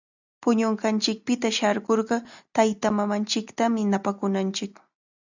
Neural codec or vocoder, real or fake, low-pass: none; real; 7.2 kHz